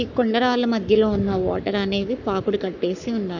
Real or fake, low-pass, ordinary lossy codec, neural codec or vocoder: fake; 7.2 kHz; none; codec, 44.1 kHz, 7.8 kbps, Pupu-Codec